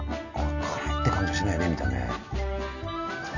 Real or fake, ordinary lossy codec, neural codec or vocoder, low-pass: real; none; none; 7.2 kHz